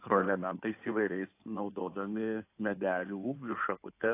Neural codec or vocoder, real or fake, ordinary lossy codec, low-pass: codec, 16 kHz in and 24 kHz out, 2.2 kbps, FireRedTTS-2 codec; fake; AAC, 24 kbps; 3.6 kHz